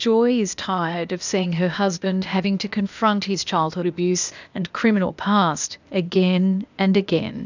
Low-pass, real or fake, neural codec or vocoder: 7.2 kHz; fake; codec, 16 kHz, 0.8 kbps, ZipCodec